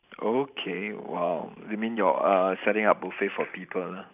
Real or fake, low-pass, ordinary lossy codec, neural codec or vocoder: fake; 3.6 kHz; none; vocoder, 44.1 kHz, 128 mel bands every 512 samples, BigVGAN v2